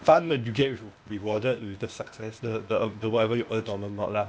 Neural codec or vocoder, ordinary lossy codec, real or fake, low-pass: codec, 16 kHz, 0.8 kbps, ZipCodec; none; fake; none